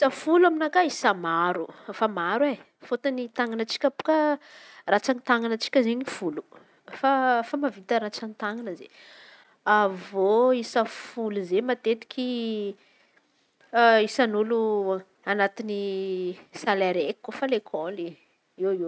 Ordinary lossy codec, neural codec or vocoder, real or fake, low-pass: none; none; real; none